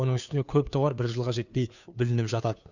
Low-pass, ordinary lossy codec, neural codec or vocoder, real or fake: 7.2 kHz; none; codec, 16 kHz, 2 kbps, FunCodec, trained on Chinese and English, 25 frames a second; fake